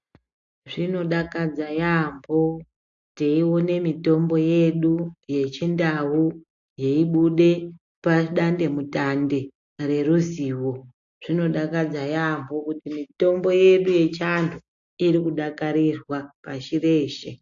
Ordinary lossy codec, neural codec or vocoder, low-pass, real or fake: AAC, 64 kbps; none; 7.2 kHz; real